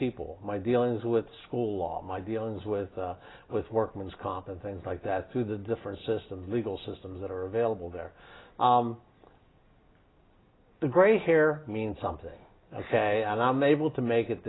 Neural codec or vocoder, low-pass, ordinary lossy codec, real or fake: none; 7.2 kHz; AAC, 16 kbps; real